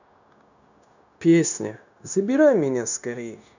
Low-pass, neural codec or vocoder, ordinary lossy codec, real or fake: 7.2 kHz; codec, 16 kHz, 0.9 kbps, LongCat-Audio-Codec; none; fake